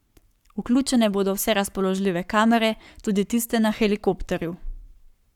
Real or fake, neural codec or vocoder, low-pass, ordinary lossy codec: fake; codec, 44.1 kHz, 7.8 kbps, Pupu-Codec; 19.8 kHz; none